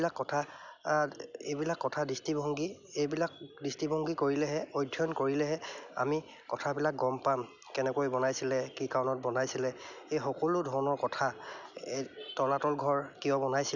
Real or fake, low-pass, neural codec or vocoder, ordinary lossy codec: real; 7.2 kHz; none; none